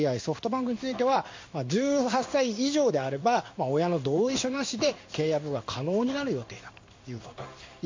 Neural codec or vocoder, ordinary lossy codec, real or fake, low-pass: codec, 16 kHz in and 24 kHz out, 1 kbps, XY-Tokenizer; MP3, 48 kbps; fake; 7.2 kHz